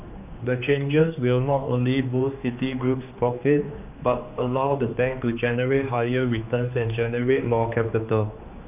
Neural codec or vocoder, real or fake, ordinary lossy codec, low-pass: codec, 16 kHz, 2 kbps, X-Codec, HuBERT features, trained on general audio; fake; none; 3.6 kHz